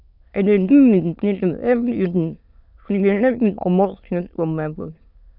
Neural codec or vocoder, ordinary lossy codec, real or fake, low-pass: autoencoder, 22.05 kHz, a latent of 192 numbers a frame, VITS, trained on many speakers; none; fake; 5.4 kHz